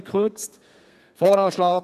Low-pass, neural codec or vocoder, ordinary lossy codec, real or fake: 14.4 kHz; codec, 32 kHz, 1.9 kbps, SNAC; none; fake